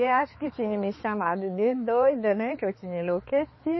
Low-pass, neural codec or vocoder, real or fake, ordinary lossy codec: 7.2 kHz; codec, 16 kHz, 4 kbps, X-Codec, HuBERT features, trained on balanced general audio; fake; MP3, 24 kbps